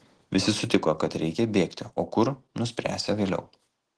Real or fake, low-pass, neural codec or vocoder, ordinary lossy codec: real; 10.8 kHz; none; Opus, 16 kbps